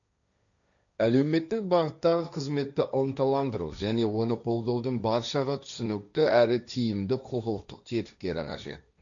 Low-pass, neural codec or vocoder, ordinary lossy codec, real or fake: 7.2 kHz; codec, 16 kHz, 1.1 kbps, Voila-Tokenizer; none; fake